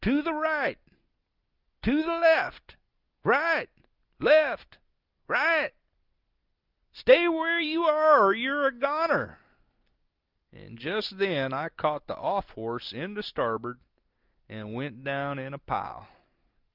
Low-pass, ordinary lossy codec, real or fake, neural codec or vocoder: 5.4 kHz; Opus, 24 kbps; real; none